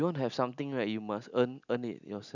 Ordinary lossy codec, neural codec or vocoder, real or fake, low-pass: none; none; real; 7.2 kHz